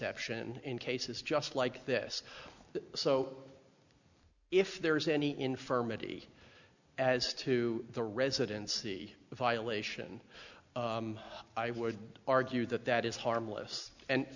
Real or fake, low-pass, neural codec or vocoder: real; 7.2 kHz; none